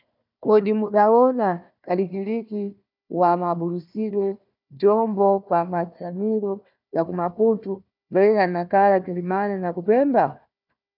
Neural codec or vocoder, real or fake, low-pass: codec, 16 kHz, 1 kbps, FunCodec, trained on Chinese and English, 50 frames a second; fake; 5.4 kHz